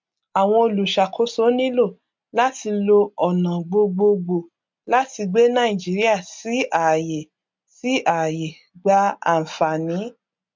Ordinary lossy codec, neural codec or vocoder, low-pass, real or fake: MP3, 64 kbps; none; 7.2 kHz; real